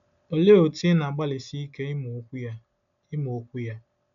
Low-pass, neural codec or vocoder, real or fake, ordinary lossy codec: 7.2 kHz; none; real; none